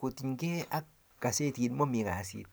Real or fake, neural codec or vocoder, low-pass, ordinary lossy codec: real; none; none; none